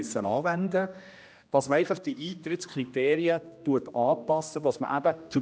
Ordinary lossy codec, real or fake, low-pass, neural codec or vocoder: none; fake; none; codec, 16 kHz, 1 kbps, X-Codec, HuBERT features, trained on general audio